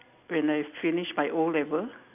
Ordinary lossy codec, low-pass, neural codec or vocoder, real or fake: none; 3.6 kHz; none; real